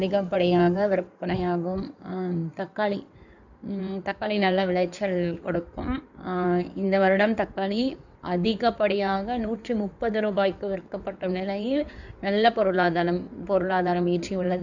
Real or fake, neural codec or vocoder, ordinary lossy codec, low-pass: fake; codec, 16 kHz in and 24 kHz out, 2.2 kbps, FireRedTTS-2 codec; none; 7.2 kHz